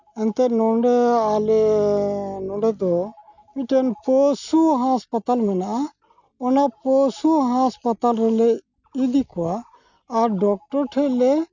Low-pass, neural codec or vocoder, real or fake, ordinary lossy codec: 7.2 kHz; none; real; none